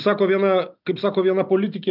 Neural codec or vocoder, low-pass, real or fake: none; 5.4 kHz; real